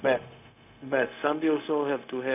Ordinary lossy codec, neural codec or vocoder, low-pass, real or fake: none; codec, 16 kHz, 0.4 kbps, LongCat-Audio-Codec; 3.6 kHz; fake